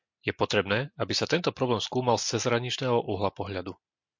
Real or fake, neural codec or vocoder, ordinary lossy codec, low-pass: real; none; MP3, 48 kbps; 7.2 kHz